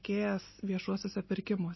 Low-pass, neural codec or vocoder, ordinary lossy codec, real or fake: 7.2 kHz; none; MP3, 24 kbps; real